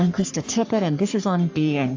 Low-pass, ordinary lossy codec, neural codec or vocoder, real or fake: 7.2 kHz; AAC, 48 kbps; codec, 44.1 kHz, 3.4 kbps, Pupu-Codec; fake